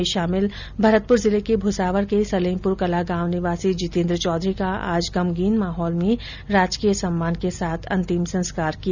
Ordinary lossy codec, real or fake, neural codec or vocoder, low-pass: none; real; none; 7.2 kHz